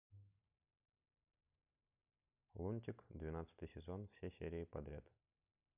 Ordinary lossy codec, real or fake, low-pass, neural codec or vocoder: none; real; 3.6 kHz; none